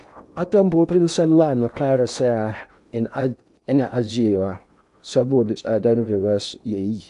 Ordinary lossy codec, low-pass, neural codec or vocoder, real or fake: none; 10.8 kHz; codec, 16 kHz in and 24 kHz out, 0.8 kbps, FocalCodec, streaming, 65536 codes; fake